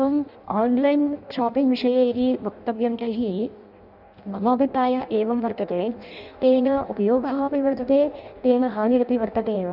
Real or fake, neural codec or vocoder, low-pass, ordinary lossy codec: fake; codec, 16 kHz in and 24 kHz out, 0.6 kbps, FireRedTTS-2 codec; 5.4 kHz; none